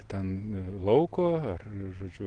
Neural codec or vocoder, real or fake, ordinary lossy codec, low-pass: none; real; Opus, 16 kbps; 9.9 kHz